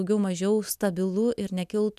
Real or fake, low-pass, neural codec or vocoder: real; 14.4 kHz; none